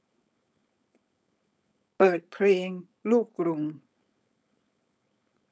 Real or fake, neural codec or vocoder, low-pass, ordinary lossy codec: fake; codec, 16 kHz, 4.8 kbps, FACodec; none; none